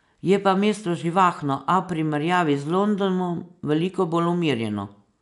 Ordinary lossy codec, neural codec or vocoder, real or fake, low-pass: none; none; real; 10.8 kHz